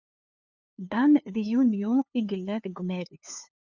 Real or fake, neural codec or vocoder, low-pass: fake; codec, 16 kHz, 2 kbps, FunCodec, trained on LibriTTS, 25 frames a second; 7.2 kHz